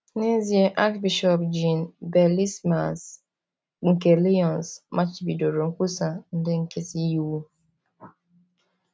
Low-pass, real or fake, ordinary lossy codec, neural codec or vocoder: none; real; none; none